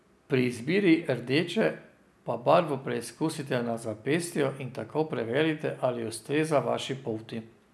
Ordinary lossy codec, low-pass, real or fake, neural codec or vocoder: none; none; real; none